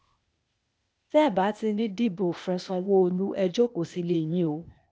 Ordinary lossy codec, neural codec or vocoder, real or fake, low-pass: none; codec, 16 kHz, 0.8 kbps, ZipCodec; fake; none